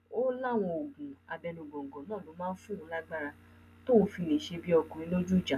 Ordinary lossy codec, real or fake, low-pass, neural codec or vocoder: none; real; 14.4 kHz; none